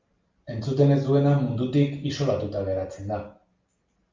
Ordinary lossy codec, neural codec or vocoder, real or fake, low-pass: Opus, 24 kbps; none; real; 7.2 kHz